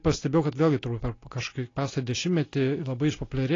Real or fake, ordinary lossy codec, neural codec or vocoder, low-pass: real; AAC, 32 kbps; none; 7.2 kHz